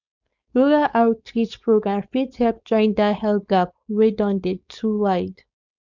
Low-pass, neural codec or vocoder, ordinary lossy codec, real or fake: 7.2 kHz; codec, 16 kHz, 4.8 kbps, FACodec; none; fake